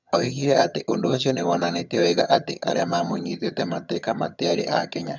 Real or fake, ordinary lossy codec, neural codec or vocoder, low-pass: fake; none; vocoder, 22.05 kHz, 80 mel bands, HiFi-GAN; 7.2 kHz